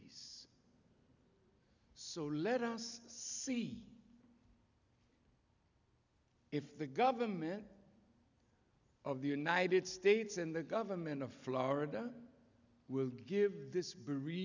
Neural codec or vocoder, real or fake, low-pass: none; real; 7.2 kHz